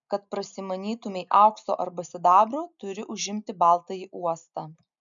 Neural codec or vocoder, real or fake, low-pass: none; real; 7.2 kHz